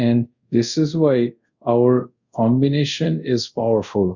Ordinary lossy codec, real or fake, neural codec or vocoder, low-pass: Opus, 64 kbps; fake; codec, 24 kHz, 0.5 kbps, DualCodec; 7.2 kHz